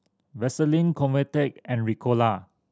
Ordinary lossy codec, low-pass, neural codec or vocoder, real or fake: none; none; none; real